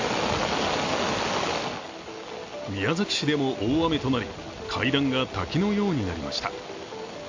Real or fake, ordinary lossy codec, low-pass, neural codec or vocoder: real; AAC, 48 kbps; 7.2 kHz; none